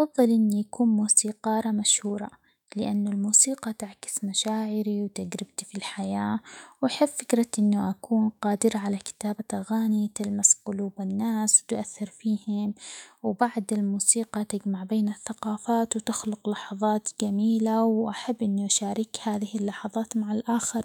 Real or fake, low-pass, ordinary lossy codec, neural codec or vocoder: fake; 19.8 kHz; none; autoencoder, 48 kHz, 128 numbers a frame, DAC-VAE, trained on Japanese speech